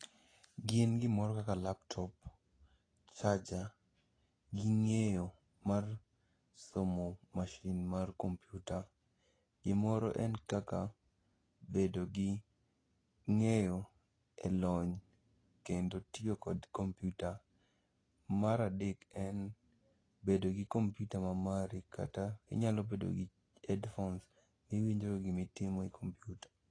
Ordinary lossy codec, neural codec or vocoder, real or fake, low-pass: AAC, 32 kbps; none; real; 9.9 kHz